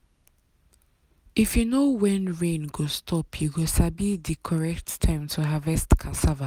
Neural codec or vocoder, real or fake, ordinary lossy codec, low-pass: none; real; none; 19.8 kHz